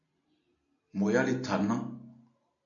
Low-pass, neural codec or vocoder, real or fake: 7.2 kHz; none; real